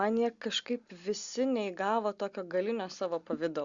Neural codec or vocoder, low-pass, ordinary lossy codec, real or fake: none; 7.2 kHz; Opus, 64 kbps; real